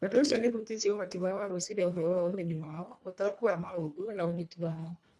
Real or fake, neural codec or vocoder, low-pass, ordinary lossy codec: fake; codec, 24 kHz, 1.5 kbps, HILCodec; none; none